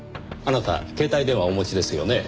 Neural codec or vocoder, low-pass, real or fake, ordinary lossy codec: none; none; real; none